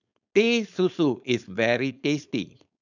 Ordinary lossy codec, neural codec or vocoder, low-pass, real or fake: none; codec, 16 kHz, 4.8 kbps, FACodec; 7.2 kHz; fake